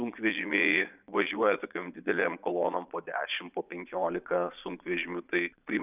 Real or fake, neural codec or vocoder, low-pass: fake; vocoder, 22.05 kHz, 80 mel bands, Vocos; 3.6 kHz